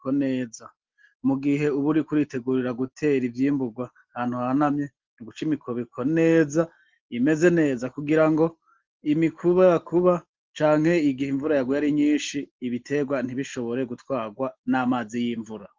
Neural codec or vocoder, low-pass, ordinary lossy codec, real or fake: none; 7.2 kHz; Opus, 16 kbps; real